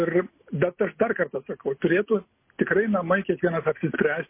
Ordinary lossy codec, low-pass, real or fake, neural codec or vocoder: MP3, 24 kbps; 3.6 kHz; real; none